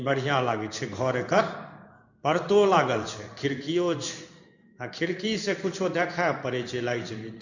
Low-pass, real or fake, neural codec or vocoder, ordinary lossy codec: 7.2 kHz; fake; codec, 16 kHz in and 24 kHz out, 1 kbps, XY-Tokenizer; none